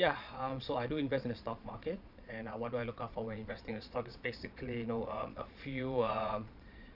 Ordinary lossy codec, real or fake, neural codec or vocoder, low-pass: none; fake; vocoder, 22.05 kHz, 80 mel bands, Vocos; 5.4 kHz